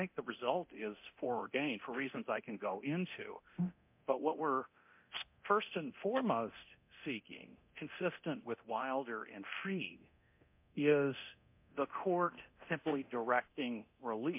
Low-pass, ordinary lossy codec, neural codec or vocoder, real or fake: 3.6 kHz; MP3, 32 kbps; codec, 24 kHz, 0.9 kbps, DualCodec; fake